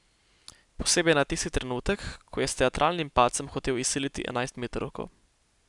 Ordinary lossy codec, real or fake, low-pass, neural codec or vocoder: none; real; 10.8 kHz; none